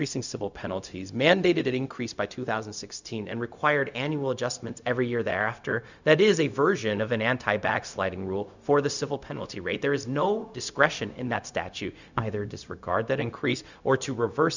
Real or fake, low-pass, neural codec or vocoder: fake; 7.2 kHz; codec, 16 kHz, 0.4 kbps, LongCat-Audio-Codec